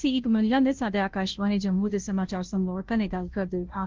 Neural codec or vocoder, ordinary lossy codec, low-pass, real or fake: codec, 16 kHz, 0.5 kbps, FunCodec, trained on Chinese and English, 25 frames a second; Opus, 16 kbps; 7.2 kHz; fake